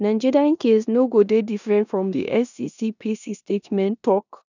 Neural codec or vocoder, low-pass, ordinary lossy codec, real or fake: codec, 16 kHz in and 24 kHz out, 0.9 kbps, LongCat-Audio-Codec, four codebook decoder; 7.2 kHz; none; fake